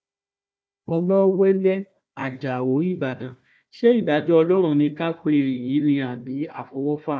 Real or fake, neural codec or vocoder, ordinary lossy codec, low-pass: fake; codec, 16 kHz, 1 kbps, FunCodec, trained on Chinese and English, 50 frames a second; none; none